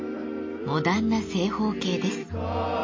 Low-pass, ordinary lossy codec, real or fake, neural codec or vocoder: 7.2 kHz; MP3, 32 kbps; real; none